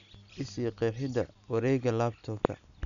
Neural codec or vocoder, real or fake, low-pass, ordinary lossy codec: none; real; 7.2 kHz; none